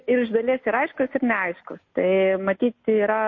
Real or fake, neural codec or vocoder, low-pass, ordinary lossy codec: real; none; 7.2 kHz; MP3, 32 kbps